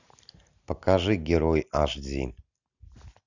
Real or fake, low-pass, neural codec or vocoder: real; 7.2 kHz; none